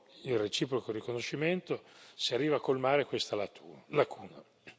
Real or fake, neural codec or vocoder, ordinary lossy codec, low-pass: real; none; none; none